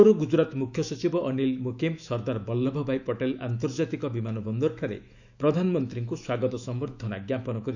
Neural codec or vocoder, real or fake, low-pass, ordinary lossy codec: autoencoder, 48 kHz, 128 numbers a frame, DAC-VAE, trained on Japanese speech; fake; 7.2 kHz; none